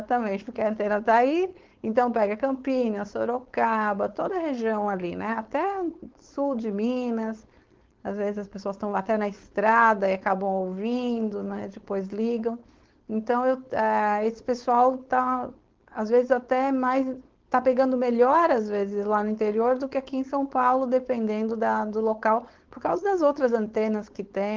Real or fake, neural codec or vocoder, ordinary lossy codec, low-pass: fake; codec, 16 kHz, 4.8 kbps, FACodec; Opus, 16 kbps; 7.2 kHz